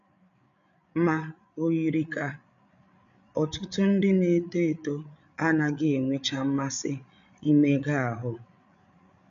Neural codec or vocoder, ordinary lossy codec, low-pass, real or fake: codec, 16 kHz, 8 kbps, FreqCodec, larger model; none; 7.2 kHz; fake